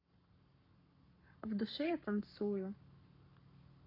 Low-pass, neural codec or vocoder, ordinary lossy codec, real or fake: 5.4 kHz; codec, 44.1 kHz, 7.8 kbps, Pupu-Codec; none; fake